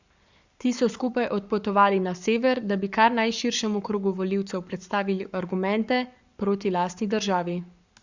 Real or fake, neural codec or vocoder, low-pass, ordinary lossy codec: fake; codec, 44.1 kHz, 7.8 kbps, Pupu-Codec; 7.2 kHz; Opus, 64 kbps